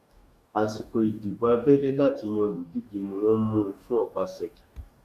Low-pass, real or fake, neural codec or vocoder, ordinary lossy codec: 14.4 kHz; fake; codec, 44.1 kHz, 2.6 kbps, DAC; Opus, 64 kbps